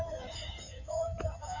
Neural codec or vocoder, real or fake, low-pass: codec, 16 kHz in and 24 kHz out, 2.2 kbps, FireRedTTS-2 codec; fake; 7.2 kHz